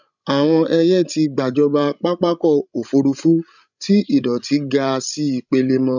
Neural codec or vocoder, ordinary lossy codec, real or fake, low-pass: codec, 16 kHz, 16 kbps, FreqCodec, larger model; none; fake; 7.2 kHz